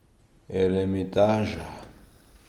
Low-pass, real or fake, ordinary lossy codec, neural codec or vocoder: 19.8 kHz; real; Opus, 24 kbps; none